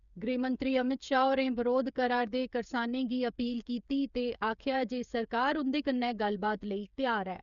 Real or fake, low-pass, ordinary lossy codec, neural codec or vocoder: fake; 7.2 kHz; none; codec, 16 kHz, 8 kbps, FreqCodec, smaller model